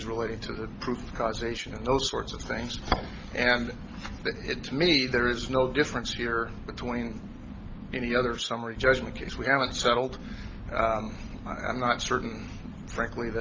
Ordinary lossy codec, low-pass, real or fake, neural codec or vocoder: Opus, 24 kbps; 7.2 kHz; real; none